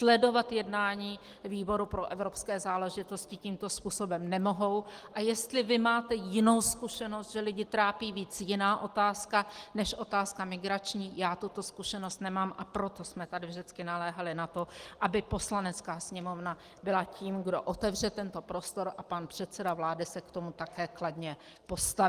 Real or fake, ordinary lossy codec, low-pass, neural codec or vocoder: real; Opus, 24 kbps; 14.4 kHz; none